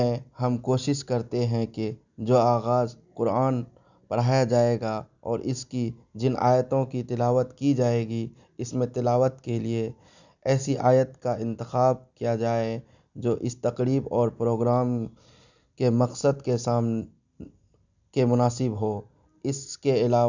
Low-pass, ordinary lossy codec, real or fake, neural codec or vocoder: 7.2 kHz; none; real; none